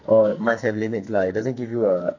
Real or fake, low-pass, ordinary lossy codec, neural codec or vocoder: fake; 7.2 kHz; none; codec, 44.1 kHz, 2.6 kbps, SNAC